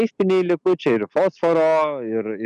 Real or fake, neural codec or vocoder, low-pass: real; none; 10.8 kHz